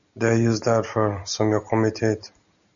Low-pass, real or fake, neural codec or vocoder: 7.2 kHz; real; none